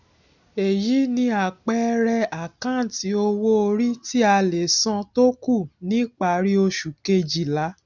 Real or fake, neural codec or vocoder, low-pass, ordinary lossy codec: real; none; 7.2 kHz; none